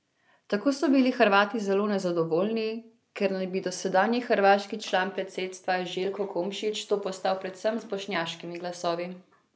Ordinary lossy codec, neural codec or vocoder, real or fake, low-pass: none; none; real; none